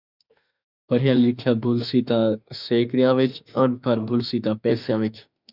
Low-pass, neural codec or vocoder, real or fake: 5.4 kHz; autoencoder, 48 kHz, 32 numbers a frame, DAC-VAE, trained on Japanese speech; fake